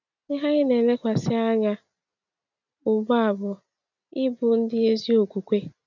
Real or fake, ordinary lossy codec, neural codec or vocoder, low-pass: real; none; none; 7.2 kHz